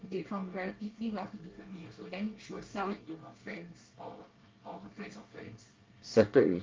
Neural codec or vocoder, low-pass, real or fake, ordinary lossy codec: codec, 24 kHz, 1 kbps, SNAC; 7.2 kHz; fake; Opus, 24 kbps